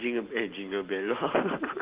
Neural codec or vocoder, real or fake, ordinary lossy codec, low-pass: none; real; Opus, 16 kbps; 3.6 kHz